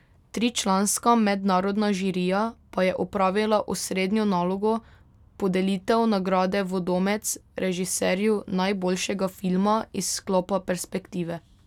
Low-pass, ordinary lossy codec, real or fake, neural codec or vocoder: 19.8 kHz; none; real; none